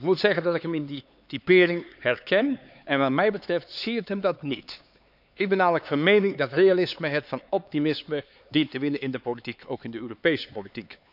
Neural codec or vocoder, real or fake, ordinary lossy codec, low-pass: codec, 16 kHz, 4 kbps, X-Codec, HuBERT features, trained on LibriSpeech; fake; none; 5.4 kHz